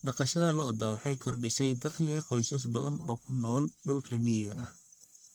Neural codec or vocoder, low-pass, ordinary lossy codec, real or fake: codec, 44.1 kHz, 1.7 kbps, Pupu-Codec; none; none; fake